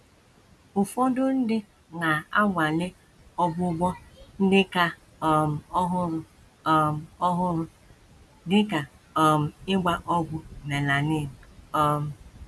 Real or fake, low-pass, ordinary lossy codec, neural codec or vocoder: real; none; none; none